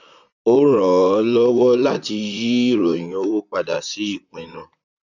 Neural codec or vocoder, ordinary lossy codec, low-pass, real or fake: vocoder, 44.1 kHz, 128 mel bands, Pupu-Vocoder; none; 7.2 kHz; fake